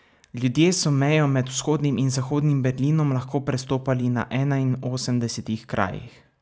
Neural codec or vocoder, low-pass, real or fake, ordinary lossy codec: none; none; real; none